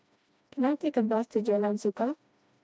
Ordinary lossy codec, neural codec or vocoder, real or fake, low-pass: none; codec, 16 kHz, 1 kbps, FreqCodec, smaller model; fake; none